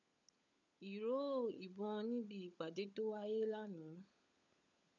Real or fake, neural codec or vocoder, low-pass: fake; codec, 16 kHz, 16 kbps, FunCodec, trained on LibriTTS, 50 frames a second; 7.2 kHz